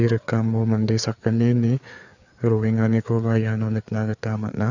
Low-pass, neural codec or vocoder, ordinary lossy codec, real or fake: 7.2 kHz; codec, 16 kHz, 4 kbps, FreqCodec, larger model; none; fake